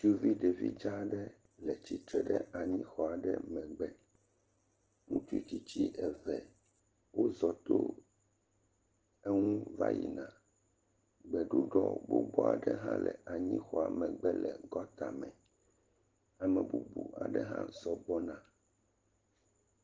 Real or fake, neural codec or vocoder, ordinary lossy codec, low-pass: real; none; Opus, 16 kbps; 7.2 kHz